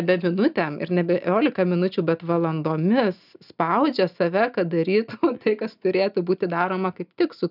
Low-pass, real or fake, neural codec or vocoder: 5.4 kHz; real; none